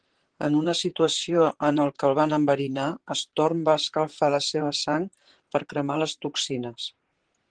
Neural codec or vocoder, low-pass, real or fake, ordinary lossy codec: vocoder, 44.1 kHz, 128 mel bands, Pupu-Vocoder; 9.9 kHz; fake; Opus, 16 kbps